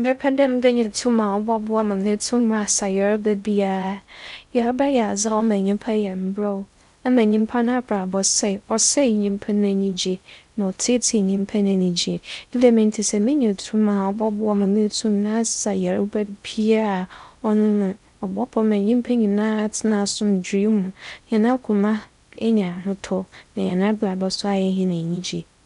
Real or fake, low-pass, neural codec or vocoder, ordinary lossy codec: fake; 10.8 kHz; codec, 16 kHz in and 24 kHz out, 0.6 kbps, FocalCodec, streaming, 4096 codes; none